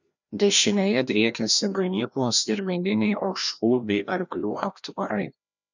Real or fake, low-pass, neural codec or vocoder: fake; 7.2 kHz; codec, 16 kHz, 1 kbps, FreqCodec, larger model